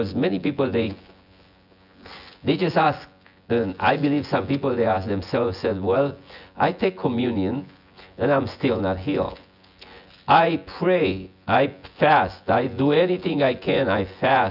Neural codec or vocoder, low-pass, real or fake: vocoder, 24 kHz, 100 mel bands, Vocos; 5.4 kHz; fake